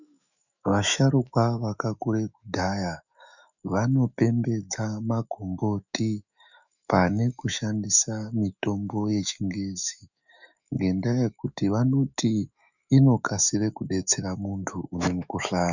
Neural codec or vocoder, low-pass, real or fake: autoencoder, 48 kHz, 128 numbers a frame, DAC-VAE, trained on Japanese speech; 7.2 kHz; fake